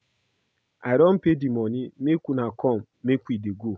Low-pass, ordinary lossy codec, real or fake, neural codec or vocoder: none; none; real; none